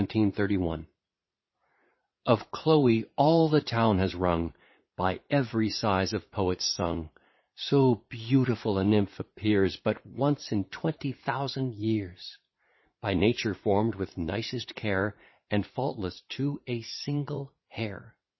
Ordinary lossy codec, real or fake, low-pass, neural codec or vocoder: MP3, 24 kbps; fake; 7.2 kHz; vocoder, 44.1 kHz, 80 mel bands, Vocos